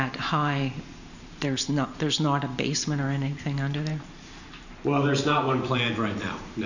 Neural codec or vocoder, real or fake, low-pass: vocoder, 44.1 kHz, 128 mel bands every 512 samples, BigVGAN v2; fake; 7.2 kHz